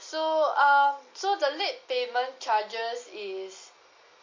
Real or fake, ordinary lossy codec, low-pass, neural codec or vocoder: real; MP3, 32 kbps; 7.2 kHz; none